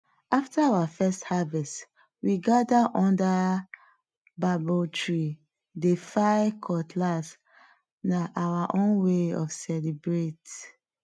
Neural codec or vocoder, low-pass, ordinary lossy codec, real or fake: none; none; none; real